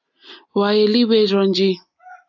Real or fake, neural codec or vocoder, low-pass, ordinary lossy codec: real; none; 7.2 kHz; AAC, 48 kbps